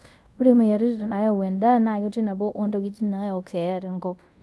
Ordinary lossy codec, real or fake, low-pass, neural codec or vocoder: none; fake; none; codec, 24 kHz, 0.5 kbps, DualCodec